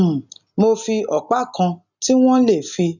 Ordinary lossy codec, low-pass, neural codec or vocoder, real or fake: none; 7.2 kHz; none; real